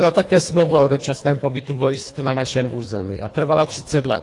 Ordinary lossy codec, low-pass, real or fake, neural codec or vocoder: AAC, 48 kbps; 10.8 kHz; fake; codec, 24 kHz, 1.5 kbps, HILCodec